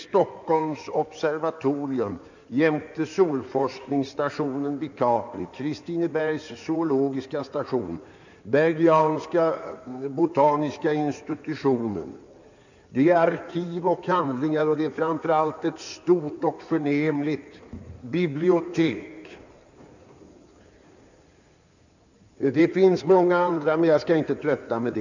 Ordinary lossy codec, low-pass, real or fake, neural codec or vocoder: none; 7.2 kHz; fake; codec, 16 kHz in and 24 kHz out, 2.2 kbps, FireRedTTS-2 codec